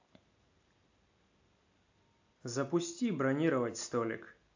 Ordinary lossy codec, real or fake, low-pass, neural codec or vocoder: AAC, 48 kbps; real; 7.2 kHz; none